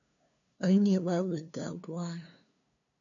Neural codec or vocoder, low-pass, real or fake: codec, 16 kHz, 2 kbps, FunCodec, trained on LibriTTS, 25 frames a second; 7.2 kHz; fake